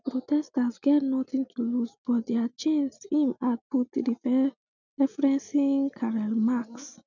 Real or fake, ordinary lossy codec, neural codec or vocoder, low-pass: real; none; none; 7.2 kHz